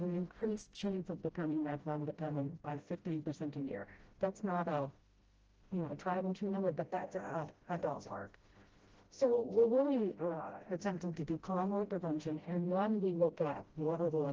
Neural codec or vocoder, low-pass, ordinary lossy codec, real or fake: codec, 16 kHz, 0.5 kbps, FreqCodec, smaller model; 7.2 kHz; Opus, 16 kbps; fake